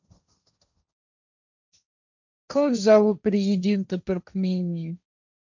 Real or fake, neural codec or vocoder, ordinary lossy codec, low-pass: fake; codec, 16 kHz, 1.1 kbps, Voila-Tokenizer; none; 7.2 kHz